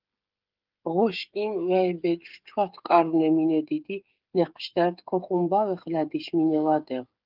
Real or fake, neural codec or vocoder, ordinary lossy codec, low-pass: fake; codec, 16 kHz, 8 kbps, FreqCodec, smaller model; Opus, 32 kbps; 5.4 kHz